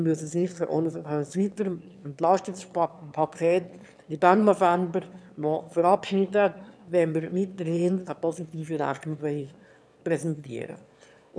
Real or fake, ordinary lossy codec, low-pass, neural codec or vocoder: fake; none; none; autoencoder, 22.05 kHz, a latent of 192 numbers a frame, VITS, trained on one speaker